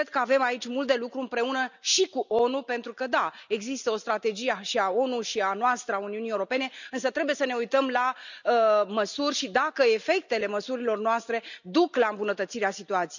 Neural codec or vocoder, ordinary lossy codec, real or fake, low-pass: none; none; real; 7.2 kHz